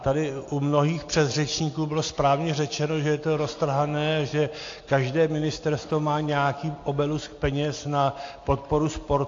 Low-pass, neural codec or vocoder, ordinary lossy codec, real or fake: 7.2 kHz; none; AAC, 48 kbps; real